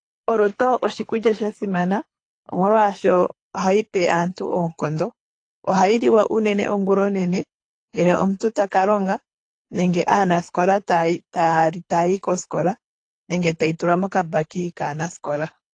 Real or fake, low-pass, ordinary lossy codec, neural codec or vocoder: fake; 9.9 kHz; AAC, 48 kbps; codec, 24 kHz, 3 kbps, HILCodec